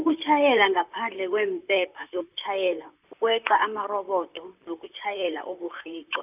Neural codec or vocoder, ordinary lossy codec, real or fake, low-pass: vocoder, 44.1 kHz, 128 mel bands, Pupu-Vocoder; none; fake; 3.6 kHz